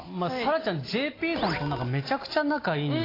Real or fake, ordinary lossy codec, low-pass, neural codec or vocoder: real; AAC, 24 kbps; 5.4 kHz; none